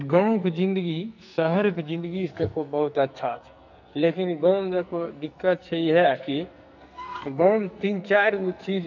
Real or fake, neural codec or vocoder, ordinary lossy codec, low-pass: fake; codec, 44.1 kHz, 2.6 kbps, SNAC; none; 7.2 kHz